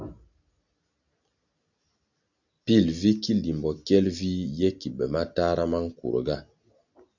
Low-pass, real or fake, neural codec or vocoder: 7.2 kHz; real; none